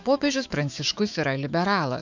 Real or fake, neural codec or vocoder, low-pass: fake; autoencoder, 48 kHz, 128 numbers a frame, DAC-VAE, trained on Japanese speech; 7.2 kHz